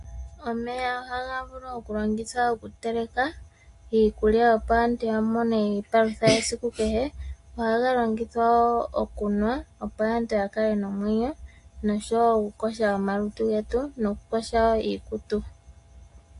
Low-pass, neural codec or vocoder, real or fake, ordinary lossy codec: 10.8 kHz; none; real; AAC, 48 kbps